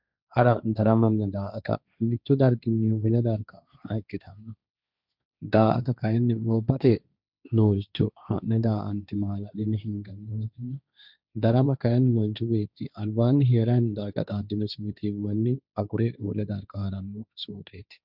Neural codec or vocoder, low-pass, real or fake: codec, 16 kHz, 1.1 kbps, Voila-Tokenizer; 5.4 kHz; fake